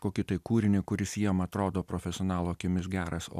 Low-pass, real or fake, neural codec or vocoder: 14.4 kHz; real; none